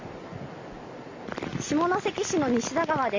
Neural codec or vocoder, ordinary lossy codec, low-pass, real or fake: none; MP3, 48 kbps; 7.2 kHz; real